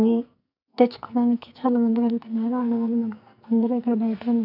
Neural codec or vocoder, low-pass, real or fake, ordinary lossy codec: codec, 44.1 kHz, 2.6 kbps, SNAC; 5.4 kHz; fake; none